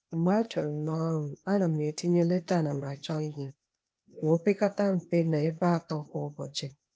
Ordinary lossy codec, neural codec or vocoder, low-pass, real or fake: none; codec, 16 kHz, 0.8 kbps, ZipCodec; none; fake